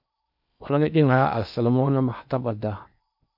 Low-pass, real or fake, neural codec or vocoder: 5.4 kHz; fake; codec, 16 kHz in and 24 kHz out, 0.8 kbps, FocalCodec, streaming, 65536 codes